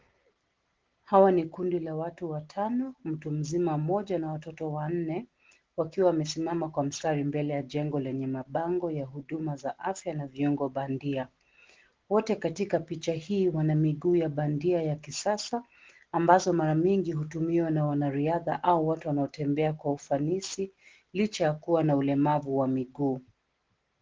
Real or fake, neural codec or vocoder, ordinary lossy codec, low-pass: real; none; Opus, 16 kbps; 7.2 kHz